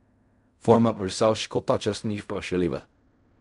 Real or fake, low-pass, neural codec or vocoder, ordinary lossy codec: fake; 10.8 kHz; codec, 16 kHz in and 24 kHz out, 0.4 kbps, LongCat-Audio-Codec, fine tuned four codebook decoder; none